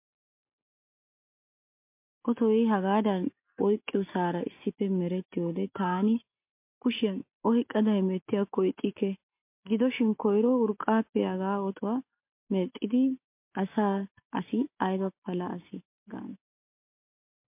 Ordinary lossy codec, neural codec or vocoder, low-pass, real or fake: MP3, 32 kbps; none; 3.6 kHz; real